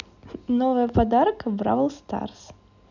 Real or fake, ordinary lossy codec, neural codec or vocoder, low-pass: real; none; none; 7.2 kHz